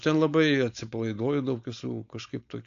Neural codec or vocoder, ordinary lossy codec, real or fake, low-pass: codec, 16 kHz, 4.8 kbps, FACodec; AAC, 64 kbps; fake; 7.2 kHz